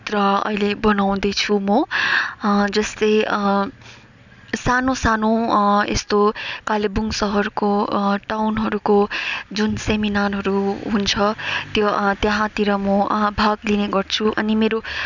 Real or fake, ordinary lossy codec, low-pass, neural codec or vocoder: real; none; 7.2 kHz; none